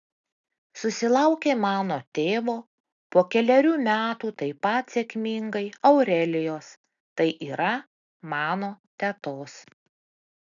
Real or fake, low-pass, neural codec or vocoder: real; 7.2 kHz; none